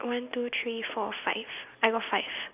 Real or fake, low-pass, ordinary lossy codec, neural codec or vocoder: real; 3.6 kHz; none; none